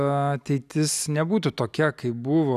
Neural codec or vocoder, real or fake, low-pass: none; real; 14.4 kHz